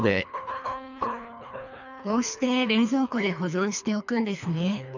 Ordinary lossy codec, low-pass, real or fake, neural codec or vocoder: none; 7.2 kHz; fake; codec, 24 kHz, 3 kbps, HILCodec